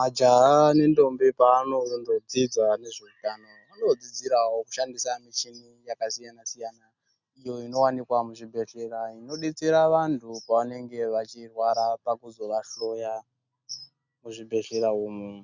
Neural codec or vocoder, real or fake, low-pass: none; real; 7.2 kHz